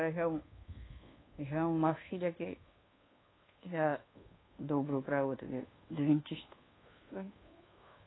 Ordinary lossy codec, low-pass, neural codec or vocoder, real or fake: AAC, 16 kbps; 7.2 kHz; codec, 24 kHz, 1.2 kbps, DualCodec; fake